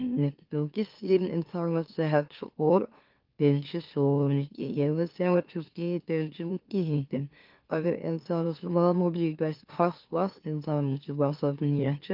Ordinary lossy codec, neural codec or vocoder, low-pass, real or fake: Opus, 32 kbps; autoencoder, 44.1 kHz, a latent of 192 numbers a frame, MeloTTS; 5.4 kHz; fake